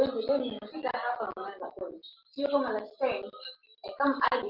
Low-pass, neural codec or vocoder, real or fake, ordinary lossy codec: 5.4 kHz; none; real; Opus, 24 kbps